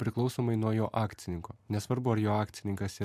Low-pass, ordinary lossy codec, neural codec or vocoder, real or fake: 14.4 kHz; MP3, 96 kbps; vocoder, 44.1 kHz, 128 mel bands every 256 samples, BigVGAN v2; fake